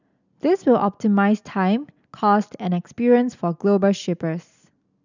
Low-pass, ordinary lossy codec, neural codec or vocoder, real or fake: 7.2 kHz; none; none; real